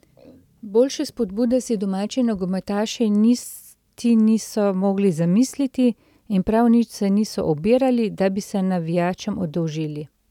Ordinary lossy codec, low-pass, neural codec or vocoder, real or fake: none; 19.8 kHz; none; real